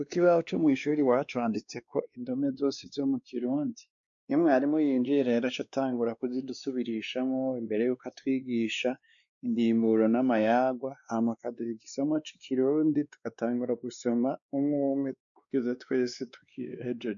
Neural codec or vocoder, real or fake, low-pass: codec, 16 kHz, 2 kbps, X-Codec, WavLM features, trained on Multilingual LibriSpeech; fake; 7.2 kHz